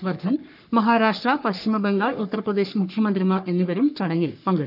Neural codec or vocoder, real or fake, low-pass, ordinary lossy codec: codec, 44.1 kHz, 3.4 kbps, Pupu-Codec; fake; 5.4 kHz; none